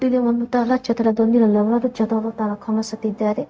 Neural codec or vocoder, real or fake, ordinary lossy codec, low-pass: codec, 16 kHz, 0.4 kbps, LongCat-Audio-Codec; fake; none; none